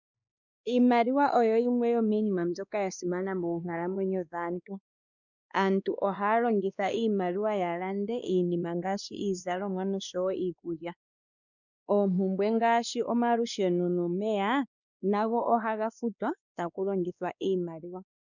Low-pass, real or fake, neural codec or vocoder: 7.2 kHz; fake; codec, 16 kHz, 2 kbps, X-Codec, WavLM features, trained on Multilingual LibriSpeech